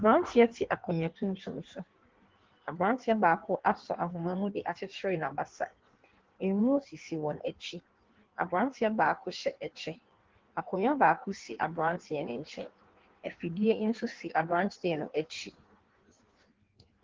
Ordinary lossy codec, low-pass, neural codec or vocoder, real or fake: Opus, 16 kbps; 7.2 kHz; codec, 16 kHz in and 24 kHz out, 1.1 kbps, FireRedTTS-2 codec; fake